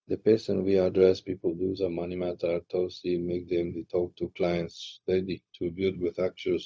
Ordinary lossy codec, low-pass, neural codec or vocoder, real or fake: none; none; codec, 16 kHz, 0.4 kbps, LongCat-Audio-Codec; fake